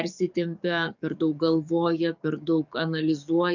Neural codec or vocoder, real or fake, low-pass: codec, 44.1 kHz, 7.8 kbps, DAC; fake; 7.2 kHz